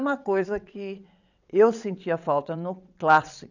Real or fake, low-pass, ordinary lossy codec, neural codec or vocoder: fake; 7.2 kHz; none; vocoder, 22.05 kHz, 80 mel bands, WaveNeXt